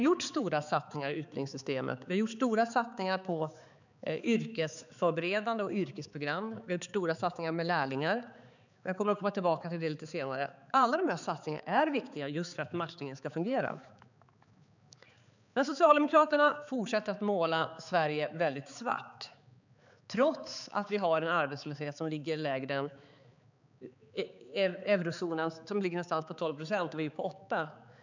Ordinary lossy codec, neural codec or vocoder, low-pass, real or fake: none; codec, 16 kHz, 4 kbps, X-Codec, HuBERT features, trained on balanced general audio; 7.2 kHz; fake